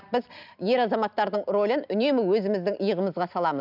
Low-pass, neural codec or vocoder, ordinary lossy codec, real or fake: 5.4 kHz; none; none; real